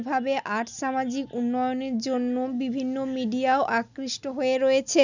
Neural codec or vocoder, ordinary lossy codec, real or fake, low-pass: none; none; real; 7.2 kHz